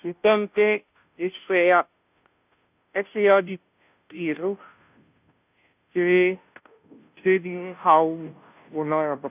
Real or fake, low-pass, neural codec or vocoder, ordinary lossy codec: fake; 3.6 kHz; codec, 16 kHz, 0.5 kbps, FunCodec, trained on Chinese and English, 25 frames a second; none